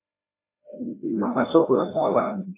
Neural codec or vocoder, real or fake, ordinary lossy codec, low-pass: codec, 16 kHz, 1 kbps, FreqCodec, larger model; fake; AAC, 24 kbps; 3.6 kHz